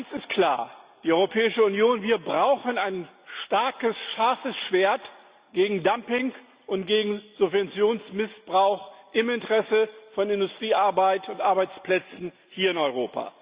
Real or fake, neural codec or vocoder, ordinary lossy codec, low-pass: real; none; Opus, 64 kbps; 3.6 kHz